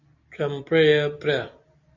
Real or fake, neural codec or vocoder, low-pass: real; none; 7.2 kHz